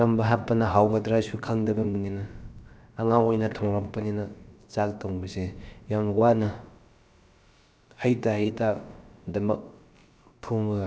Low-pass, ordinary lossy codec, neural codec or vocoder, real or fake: none; none; codec, 16 kHz, about 1 kbps, DyCAST, with the encoder's durations; fake